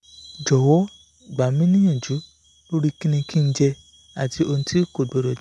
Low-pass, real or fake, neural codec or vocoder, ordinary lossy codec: none; real; none; none